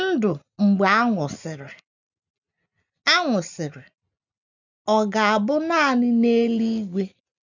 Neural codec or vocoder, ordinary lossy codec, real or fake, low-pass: none; none; real; 7.2 kHz